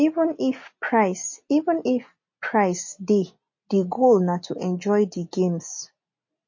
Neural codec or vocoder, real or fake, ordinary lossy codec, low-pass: none; real; MP3, 32 kbps; 7.2 kHz